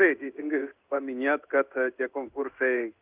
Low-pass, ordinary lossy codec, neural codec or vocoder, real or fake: 3.6 kHz; Opus, 24 kbps; codec, 16 kHz in and 24 kHz out, 1 kbps, XY-Tokenizer; fake